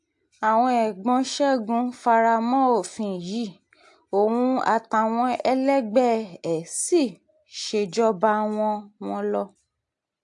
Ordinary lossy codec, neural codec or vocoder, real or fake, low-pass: AAC, 64 kbps; none; real; 10.8 kHz